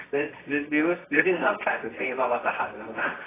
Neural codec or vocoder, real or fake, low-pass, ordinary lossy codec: codec, 24 kHz, 0.9 kbps, WavTokenizer, medium music audio release; fake; 3.6 kHz; AAC, 16 kbps